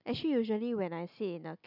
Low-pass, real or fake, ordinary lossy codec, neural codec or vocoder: 5.4 kHz; real; none; none